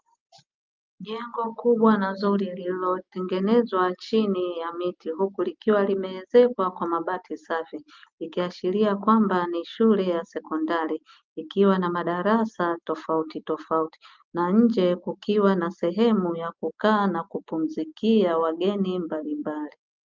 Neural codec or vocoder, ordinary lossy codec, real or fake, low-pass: none; Opus, 24 kbps; real; 7.2 kHz